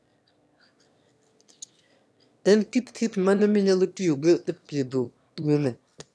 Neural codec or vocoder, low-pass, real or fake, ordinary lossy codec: autoencoder, 22.05 kHz, a latent of 192 numbers a frame, VITS, trained on one speaker; none; fake; none